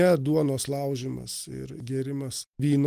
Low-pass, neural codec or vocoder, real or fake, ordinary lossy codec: 14.4 kHz; none; real; Opus, 24 kbps